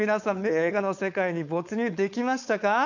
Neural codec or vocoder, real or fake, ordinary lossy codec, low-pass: codec, 16 kHz, 4.8 kbps, FACodec; fake; none; 7.2 kHz